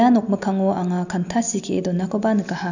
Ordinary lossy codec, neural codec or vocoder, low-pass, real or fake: none; none; 7.2 kHz; real